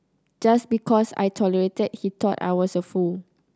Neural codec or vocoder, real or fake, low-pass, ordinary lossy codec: none; real; none; none